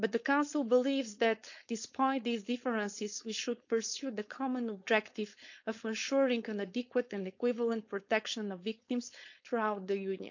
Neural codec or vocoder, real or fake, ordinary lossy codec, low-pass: codec, 16 kHz, 4.8 kbps, FACodec; fake; none; 7.2 kHz